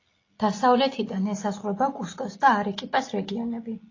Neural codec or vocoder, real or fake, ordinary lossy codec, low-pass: none; real; AAC, 32 kbps; 7.2 kHz